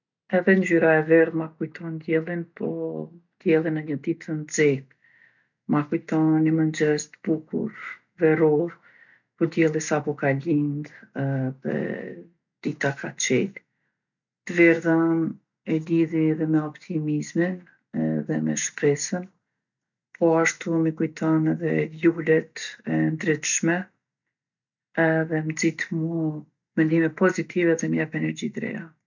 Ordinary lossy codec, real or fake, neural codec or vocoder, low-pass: none; real; none; 7.2 kHz